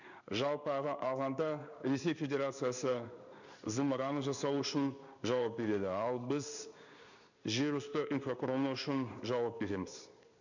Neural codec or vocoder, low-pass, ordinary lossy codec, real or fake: codec, 16 kHz in and 24 kHz out, 1 kbps, XY-Tokenizer; 7.2 kHz; none; fake